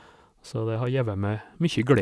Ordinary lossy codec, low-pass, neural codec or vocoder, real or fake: none; none; none; real